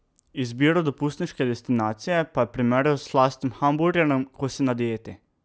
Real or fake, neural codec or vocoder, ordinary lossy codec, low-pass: real; none; none; none